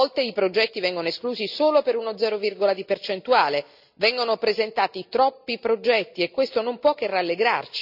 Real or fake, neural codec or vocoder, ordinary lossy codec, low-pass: real; none; none; 5.4 kHz